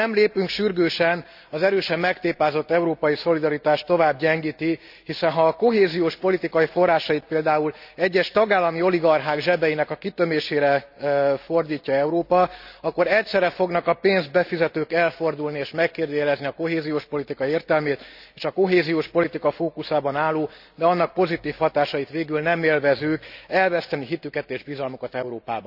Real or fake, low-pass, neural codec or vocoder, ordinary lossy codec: real; 5.4 kHz; none; none